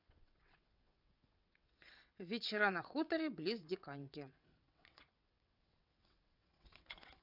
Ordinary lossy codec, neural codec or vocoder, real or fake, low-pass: none; vocoder, 22.05 kHz, 80 mel bands, WaveNeXt; fake; 5.4 kHz